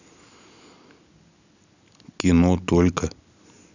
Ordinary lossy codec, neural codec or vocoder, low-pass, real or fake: none; none; 7.2 kHz; real